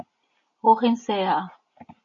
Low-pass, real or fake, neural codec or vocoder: 7.2 kHz; real; none